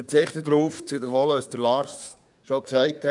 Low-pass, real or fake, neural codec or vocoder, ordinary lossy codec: 10.8 kHz; fake; codec, 24 kHz, 1 kbps, SNAC; none